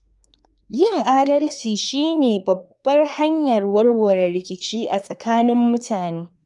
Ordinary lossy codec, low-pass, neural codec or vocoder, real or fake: none; 10.8 kHz; codec, 24 kHz, 1 kbps, SNAC; fake